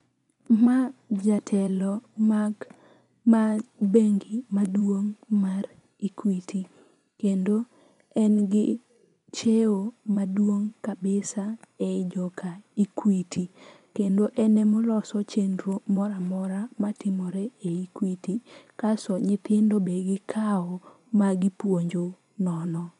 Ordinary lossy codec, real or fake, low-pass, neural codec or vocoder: none; fake; 10.8 kHz; vocoder, 24 kHz, 100 mel bands, Vocos